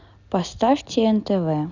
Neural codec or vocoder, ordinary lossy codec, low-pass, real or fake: none; none; 7.2 kHz; real